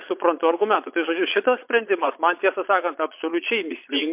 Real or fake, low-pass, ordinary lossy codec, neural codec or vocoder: fake; 3.6 kHz; MP3, 32 kbps; vocoder, 22.05 kHz, 80 mel bands, Vocos